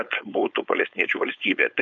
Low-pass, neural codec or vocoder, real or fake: 7.2 kHz; codec, 16 kHz, 4.8 kbps, FACodec; fake